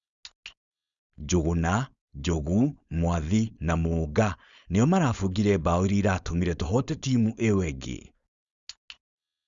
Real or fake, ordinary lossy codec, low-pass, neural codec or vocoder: fake; Opus, 64 kbps; 7.2 kHz; codec, 16 kHz, 4.8 kbps, FACodec